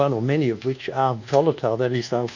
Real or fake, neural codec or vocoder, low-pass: fake; codec, 24 kHz, 1.2 kbps, DualCodec; 7.2 kHz